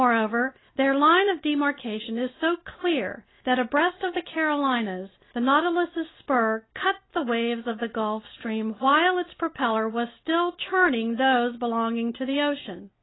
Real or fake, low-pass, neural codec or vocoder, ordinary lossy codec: real; 7.2 kHz; none; AAC, 16 kbps